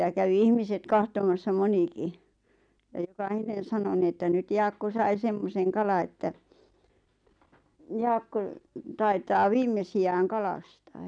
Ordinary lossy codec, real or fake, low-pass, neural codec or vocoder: none; real; 9.9 kHz; none